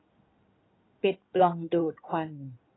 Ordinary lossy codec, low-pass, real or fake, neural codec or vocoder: AAC, 16 kbps; 7.2 kHz; fake; codec, 16 kHz in and 24 kHz out, 2.2 kbps, FireRedTTS-2 codec